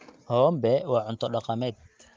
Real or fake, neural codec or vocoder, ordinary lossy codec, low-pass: real; none; Opus, 32 kbps; 7.2 kHz